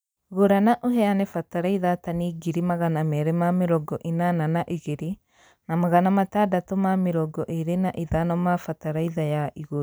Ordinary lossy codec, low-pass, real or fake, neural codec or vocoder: none; none; real; none